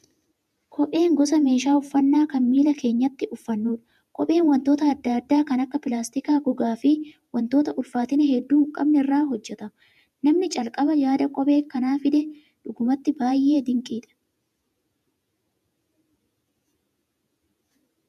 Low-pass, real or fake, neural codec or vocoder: 14.4 kHz; fake; vocoder, 48 kHz, 128 mel bands, Vocos